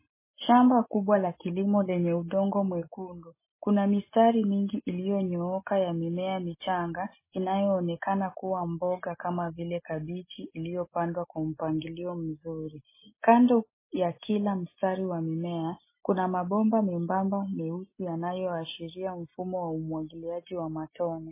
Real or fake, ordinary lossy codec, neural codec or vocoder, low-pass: real; MP3, 16 kbps; none; 3.6 kHz